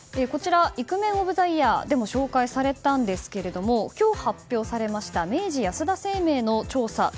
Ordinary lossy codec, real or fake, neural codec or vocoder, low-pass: none; real; none; none